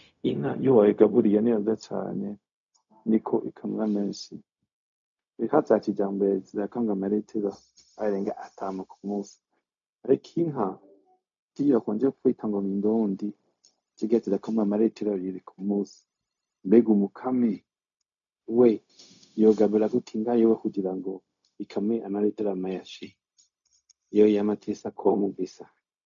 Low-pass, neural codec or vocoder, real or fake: 7.2 kHz; codec, 16 kHz, 0.4 kbps, LongCat-Audio-Codec; fake